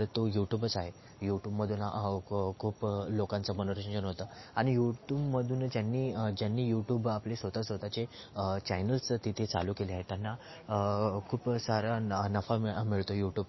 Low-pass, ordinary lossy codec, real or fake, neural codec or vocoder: 7.2 kHz; MP3, 24 kbps; real; none